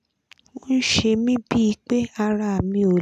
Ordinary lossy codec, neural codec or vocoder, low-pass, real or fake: none; none; 14.4 kHz; real